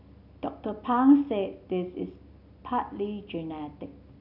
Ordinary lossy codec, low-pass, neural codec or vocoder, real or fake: none; 5.4 kHz; none; real